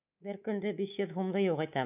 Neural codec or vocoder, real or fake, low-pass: codec, 24 kHz, 3.1 kbps, DualCodec; fake; 3.6 kHz